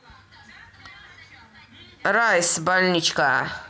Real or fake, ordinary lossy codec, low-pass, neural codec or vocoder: real; none; none; none